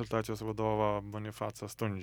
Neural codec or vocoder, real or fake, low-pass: none; real; 19.8 kHz